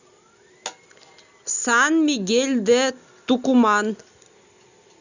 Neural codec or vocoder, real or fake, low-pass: none; real; 7.2 kHz